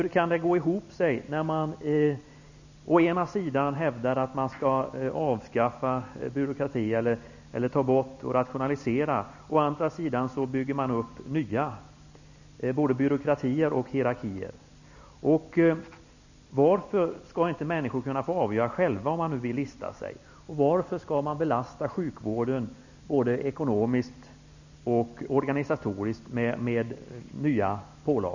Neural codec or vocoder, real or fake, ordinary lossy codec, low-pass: none; real; none; 7.2 kHz